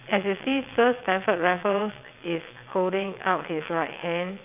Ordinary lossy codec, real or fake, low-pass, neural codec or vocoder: none; fake; 3.6 kHz; vocoder, 22.05 kHz, 80 mel bands, WaveNeXt